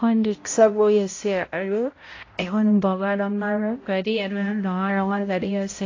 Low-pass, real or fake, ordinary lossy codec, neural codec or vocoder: 7.2 kHz; fake; AAC, 32 kbps; codec, 16 kHz, 0.5 kbps, X-Codec, HuBERT features, trained on balanced general audio